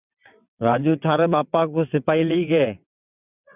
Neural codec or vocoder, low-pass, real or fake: vocoder, 22.05 kHz, 80 mel bands, WaveNeXt; 3.6 kHz; fake